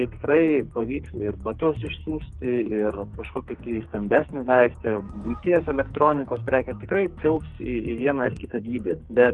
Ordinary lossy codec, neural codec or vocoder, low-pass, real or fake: Opus, 32 kbps; codec, 44.1 kHz, 2.6 kbps, SNAC; 10.8 kHz; fake